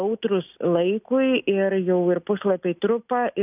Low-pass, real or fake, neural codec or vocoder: 3.6 kHz; real; none